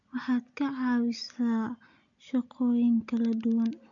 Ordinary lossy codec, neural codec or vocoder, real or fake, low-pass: none; none; real; 7.2 kHz